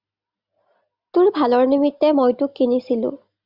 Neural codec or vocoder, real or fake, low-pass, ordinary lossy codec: none; real; 5.4 kHz; Opus, 64 kbps